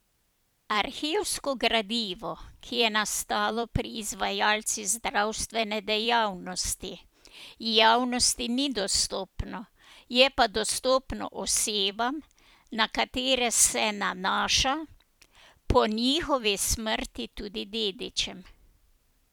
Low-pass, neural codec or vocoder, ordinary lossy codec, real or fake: none; vocoder, 44.1 kHz, 128 mel bands every 256 samples, BigVGAN v2; none; fake